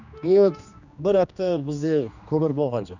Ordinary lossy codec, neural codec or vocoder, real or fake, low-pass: none; codec, 16 kHz, 2 kbps, X-Codec, HuBERT features, trained on general audio; fake; 7.2 kHz